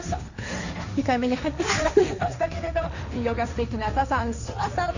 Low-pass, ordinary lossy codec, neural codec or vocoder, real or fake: 7.2 kHz; none; codec, 16 kHz, 1.1 kbps, Voila-Tokenizer; fake